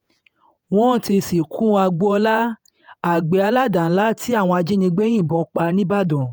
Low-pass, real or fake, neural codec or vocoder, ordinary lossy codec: none; fake; vocoder, 48 kHz, 128 mel bands, Vocos; none